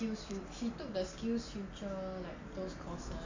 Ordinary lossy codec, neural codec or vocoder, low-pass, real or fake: AAC, 48 kbps; none; 7.2 kHz; real